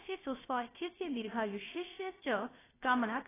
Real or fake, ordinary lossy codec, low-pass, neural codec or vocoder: fake; AAC, 16 kbps; 3.6 kHz; codec, 16 kHz, about 1 kbps, DyCAST, with the encoder's durations